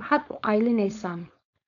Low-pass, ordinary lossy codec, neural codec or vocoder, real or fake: 7.2 kHz; none; codec, 16 kHz, 4.8 kbps, FACodec; fake